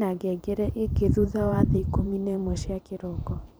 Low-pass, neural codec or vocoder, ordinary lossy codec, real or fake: none; vocoder, 44.1 kHz, 128 mel bands every 512 samples, BigVGAN v2; none; fake